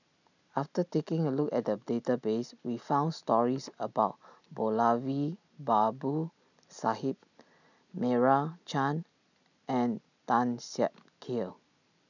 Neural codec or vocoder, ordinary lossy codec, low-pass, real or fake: none; none; 7.2 kHz; real